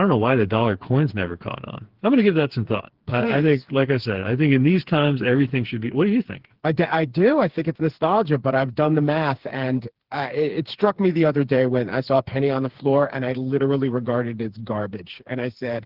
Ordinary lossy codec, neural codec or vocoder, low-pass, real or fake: Opus, 16 kbps; codec, 16 kHz, 4 kbps, FreqCodec, smaller model; 5.4 kHz; fake